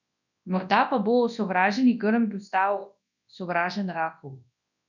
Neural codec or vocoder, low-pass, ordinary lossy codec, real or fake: codec, 24 kHz, 0.9 kbps, WavTokenizer, large speech release; 7.2 kHz; none; fake